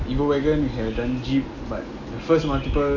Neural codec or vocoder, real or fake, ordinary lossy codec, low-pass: none; real; AAC, 48 kbps; 7.2 kHz